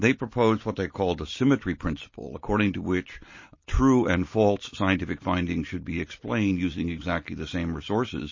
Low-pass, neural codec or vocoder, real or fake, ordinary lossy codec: 7.2 kHz; none; real; MP3, 32 kbps